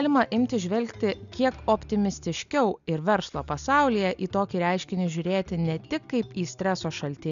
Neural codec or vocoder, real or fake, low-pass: none; real; 7.2 kHz